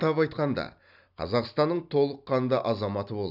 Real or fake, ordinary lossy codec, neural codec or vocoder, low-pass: real; none; none; 5.4 kHz